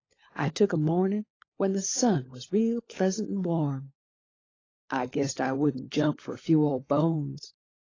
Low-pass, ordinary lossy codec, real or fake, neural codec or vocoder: 7.2 kHz; AAC, 32 kbps; fake; codec, 16 kHz, 16 kbps, FunCodec, trained on LibriTTS, 50 frames a second